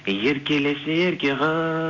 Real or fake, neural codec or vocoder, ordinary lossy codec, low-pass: real; none; none; 7.2 kHz